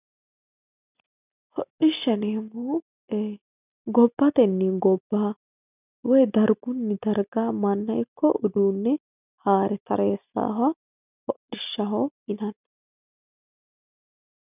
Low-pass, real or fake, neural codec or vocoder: 3.6 kHz; real; none